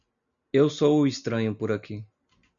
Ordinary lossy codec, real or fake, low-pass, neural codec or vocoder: MP3, 96 kbps; real; 7.2 kHz; none